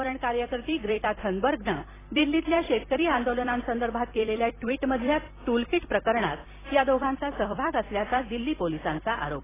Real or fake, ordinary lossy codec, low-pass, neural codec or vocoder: fake; AAC, 16 kbps; 3.6 kHz; vocoder, 44.1 kHz, 128 mel bands every 256 samples, BigVGAN v2